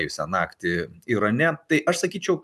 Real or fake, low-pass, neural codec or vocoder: fake; 14.4 kHz; vocoder, 44.1 kHz, 128 mel bands every 512 samples, BigVGAN v2